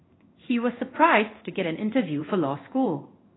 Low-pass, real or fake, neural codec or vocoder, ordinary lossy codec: 7.2 kHz; fake; codec, 16 kHz in and 24 kHz out, 1 kbps, XY-Tokenizer; AAC, 16 kbps